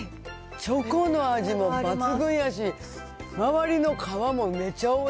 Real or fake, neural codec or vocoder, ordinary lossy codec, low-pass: real; none; none; none